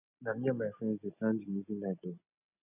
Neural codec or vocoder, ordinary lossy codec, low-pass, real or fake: none; none; 3.6 kHz; real